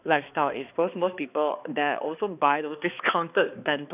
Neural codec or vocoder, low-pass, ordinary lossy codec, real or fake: codec, 16 kHz, 2 kbps, X-Codec, HuBERT features, trained on balanced general audio; 3.6 kHz; none; fake